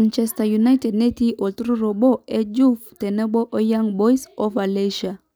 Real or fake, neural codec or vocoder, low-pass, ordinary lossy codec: real; none; none; none